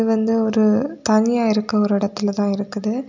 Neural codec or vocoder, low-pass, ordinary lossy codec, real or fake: none; 7.2 kHz; none; real